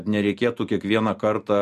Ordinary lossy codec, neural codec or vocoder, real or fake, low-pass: MP3, 64 kbps; none; real; 14.4 kHz